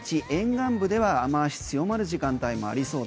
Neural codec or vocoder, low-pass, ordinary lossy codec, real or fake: none; none; none; real